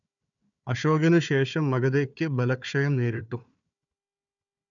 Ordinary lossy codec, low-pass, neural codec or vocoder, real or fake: none; 7.2 kHz; codec, 16 kHz, 4 kbps, FunCodec, trained on Chinese and English, 50 frames a second; fake